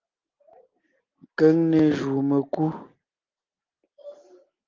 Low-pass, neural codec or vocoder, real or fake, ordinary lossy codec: 7.2 kHz; none; real; Opus, 24 kbps